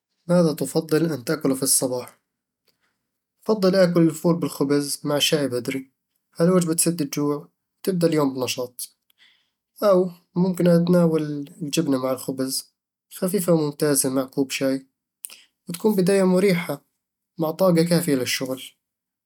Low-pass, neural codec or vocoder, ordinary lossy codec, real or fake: 19.8 kHz; none; none; real